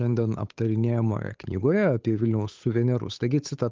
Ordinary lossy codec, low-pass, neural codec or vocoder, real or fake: Opus, 32 kbps; 7.2 kHz; codec, 16 kHz, 8 kbps, FunCodec, trained on Chinese and English, 25 frames a second; fake